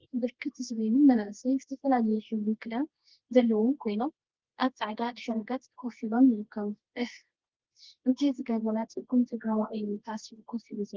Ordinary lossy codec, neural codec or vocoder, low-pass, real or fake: Opus, 16 kbps; codec, 24 kHz, 0.9 kbps, WavTokenizer, medium music audio release; 7.2 kHz; fake